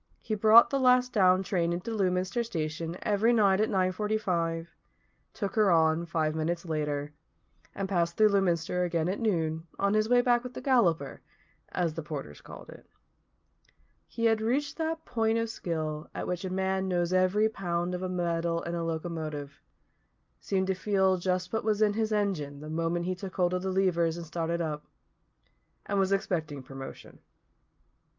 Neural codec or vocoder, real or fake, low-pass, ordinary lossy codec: none; real; 7.2 kHz; Opus, 24 kbps